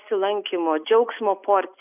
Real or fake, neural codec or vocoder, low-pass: real; none; 3.6 kHz